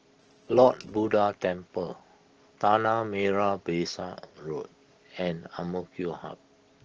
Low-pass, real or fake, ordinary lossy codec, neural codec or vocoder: 7.2 kHz; real; Opus, 16 kbps; none